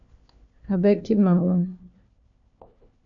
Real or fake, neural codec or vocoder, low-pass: fake; codec, 16 kHz, 1 kbps, FunCodec, trained on LibriTTS, 50 frames a second; 7.2 kHz